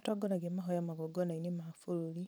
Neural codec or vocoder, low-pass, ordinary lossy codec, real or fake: vocoder, 44.1 kHz, 128 mel bands every 512 samples, BigVGAN v2; none; none; fake